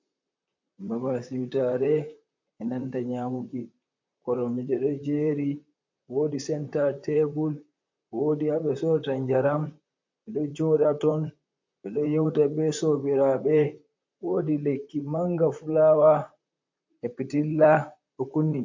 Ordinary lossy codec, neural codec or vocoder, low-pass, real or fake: MP3, 48 kbps; vocoder, 44.1 kHz, 128 mel bands, Pupu-Vocoder; 7.2 kHz; fake